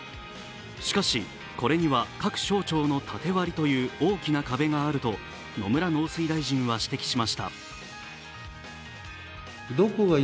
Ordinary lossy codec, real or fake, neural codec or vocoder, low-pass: none; real; none; none